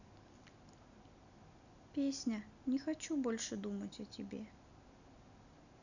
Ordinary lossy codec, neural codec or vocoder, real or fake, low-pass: none; none; real; 7.2 kHz